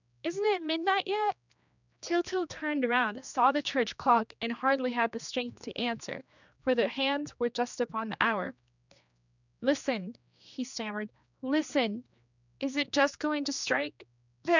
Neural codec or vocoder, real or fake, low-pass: codec, 16 kHz, 2 kbps, X-Codec, HuBERT features, trained on general audio; fake; 7.2 kHz